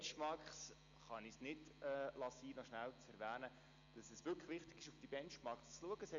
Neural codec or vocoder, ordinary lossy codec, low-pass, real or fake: none; MP3, 96 kbps; 7.2 kHz; real